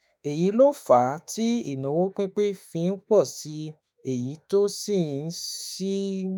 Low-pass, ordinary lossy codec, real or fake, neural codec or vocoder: none; none; fake; autoencoder, 48 kHz, 32 numbers a frame, DAC-VAE, trained on Japanese speech